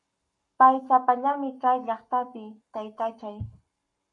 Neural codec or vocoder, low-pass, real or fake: codec, 44.1 kHz, 7.8 kbps, Pupu-Codec; 10.8 kHz; fake